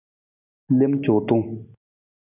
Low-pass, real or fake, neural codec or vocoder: 3.6 kHz; real; none